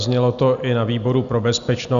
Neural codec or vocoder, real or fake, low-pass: none; real; 7.2 kHz